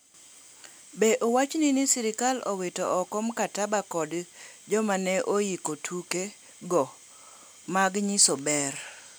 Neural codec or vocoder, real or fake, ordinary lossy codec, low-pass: none; real; none; none